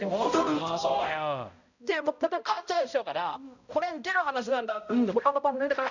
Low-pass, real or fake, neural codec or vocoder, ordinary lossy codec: 7.2 kHz; fake; codec, 16 kHz, 0.5 kbps, X-Codec, HuBERT features, trained on balanced general audio; none